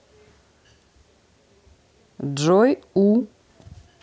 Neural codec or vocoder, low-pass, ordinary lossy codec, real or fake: none; none; none; real